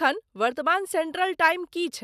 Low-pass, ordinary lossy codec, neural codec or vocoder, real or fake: 14.4 kHz; none; none; real